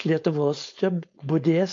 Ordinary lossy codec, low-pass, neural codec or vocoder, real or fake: MP3, 48 kbps; 7.2 kHz; codec, 16 kHz, 4.8 kbps, FACodec; fake